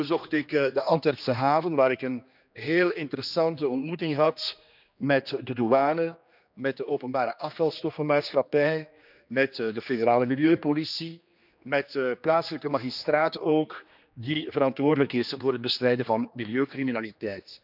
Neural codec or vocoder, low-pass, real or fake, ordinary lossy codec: codec, 16 kHz, 2 kbps, X-Codec, HuBERT features, trained on general audio; 5.4 kHz; fake; none